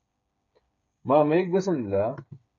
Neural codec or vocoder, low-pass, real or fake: codec, 16 kHz, 8 kbps, FreqCodec, smaller model; 7.2 kHz; fake